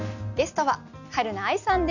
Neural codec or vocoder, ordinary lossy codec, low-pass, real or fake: none; none; 7.2 kHz; real